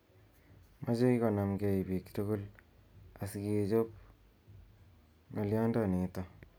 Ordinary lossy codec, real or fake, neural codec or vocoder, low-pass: none; real; none; none